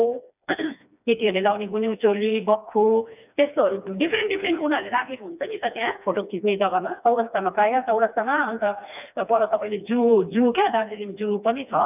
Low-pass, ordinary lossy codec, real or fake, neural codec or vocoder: 3.6 kHz; none; fake; codec, 16 kHz, 2 kbps, FreqCodec, smaller model